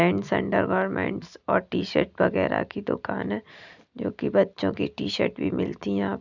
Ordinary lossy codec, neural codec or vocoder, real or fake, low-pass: none; none; real; 7.2 kHz